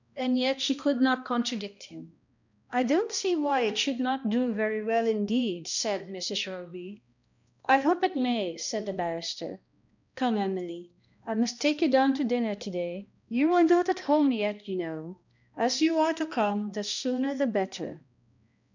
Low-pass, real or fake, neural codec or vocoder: 7.2 kHz; fake; codec, 16 kHz, 1 kbps, X-Codec, HuBERT features, trained on balanced general audio